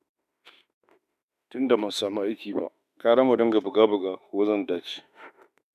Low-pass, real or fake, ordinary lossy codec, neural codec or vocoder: 14.4 kHz; fake; none; autoencoder, 48 kHz, 32 numbers a frame, DAC-VAE, trained on Japanese speech